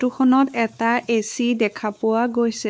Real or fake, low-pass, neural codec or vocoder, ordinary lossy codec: fake; none; codec, 16 kHz, 4 kbps, X-Codec, WavLM features, trained on Multilingual LibriSpeech; none